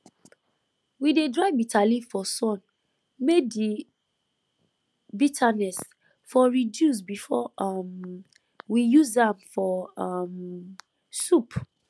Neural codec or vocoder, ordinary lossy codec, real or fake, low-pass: none; none; real; none